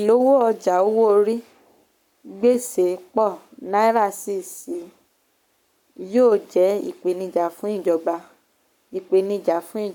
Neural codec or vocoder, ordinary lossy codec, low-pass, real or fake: codec, 44.1 kHz, 7.8 kbps, Pupu-Codec; none; 19.8 kHz; fake